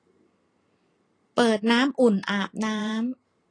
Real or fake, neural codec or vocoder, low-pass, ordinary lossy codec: fake; vocoder, 44.1 kHz, 128 mel bands every 512 samples, BigVGAN v2; 9.9 kHz; AAC, 32 kbps